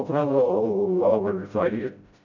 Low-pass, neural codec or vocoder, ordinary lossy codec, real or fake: 7.2 kHz; codec, 16 kHz, 0.5 kbps, FreqCodec, smaller model; none; fake